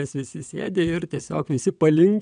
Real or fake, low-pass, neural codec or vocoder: fake; 9.9 kHz; vocoder, 22.05 kHz, 80 mel bands, WaveNeXt